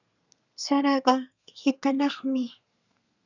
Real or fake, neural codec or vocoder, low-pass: fake; codec, 32 kHz, 1.9 kbps, SNAC; 7.2 kHz